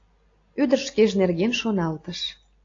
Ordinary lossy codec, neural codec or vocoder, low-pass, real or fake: AAC, 32 kbps; none; 7.2 kHz; real